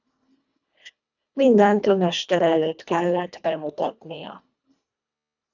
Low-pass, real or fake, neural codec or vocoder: 7.2 kHz; fake; codec, 24 kHz, 1.5 kbps, HILCodec